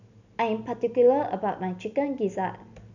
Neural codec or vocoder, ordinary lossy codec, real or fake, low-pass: none; none; real; 7.2 kHz